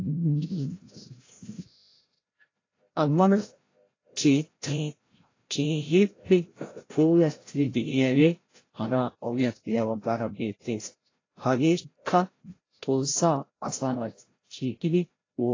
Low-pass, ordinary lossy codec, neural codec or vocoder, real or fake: 7.2 kHz; AAC, 32 kbps; codec, 16 kHz, 0.5 kbps, FreqCodec, larger model; fake